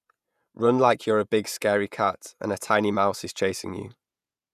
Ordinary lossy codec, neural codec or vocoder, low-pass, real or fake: none; vocoder, 48 kHz, 128 mel bands, Vocos; 14.4 kHz; fake